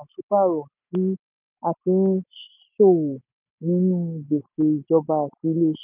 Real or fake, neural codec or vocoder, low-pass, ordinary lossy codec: real; none; 3.6 kHz; none